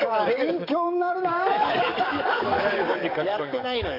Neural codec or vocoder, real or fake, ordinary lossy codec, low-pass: codec, 44.1 kHz, 7.8 kbps, DAC; fake; none; 5.4 kHz